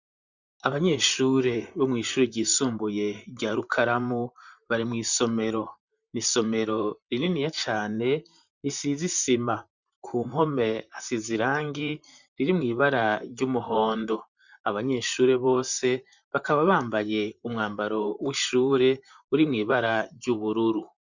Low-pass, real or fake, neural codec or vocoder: 7.2 kHz; fake; vocoder, 44.1 kHz, 128 mel bands, Pupu-Vocoder